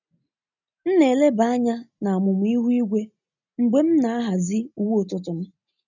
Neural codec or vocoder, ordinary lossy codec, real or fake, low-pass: none; none; real; 7.2 kHz